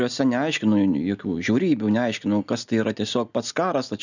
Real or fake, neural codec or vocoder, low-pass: real; none; 7.2 kHz